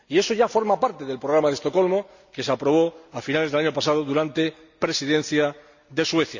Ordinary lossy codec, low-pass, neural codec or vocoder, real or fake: none; 7.2 kHz; none; real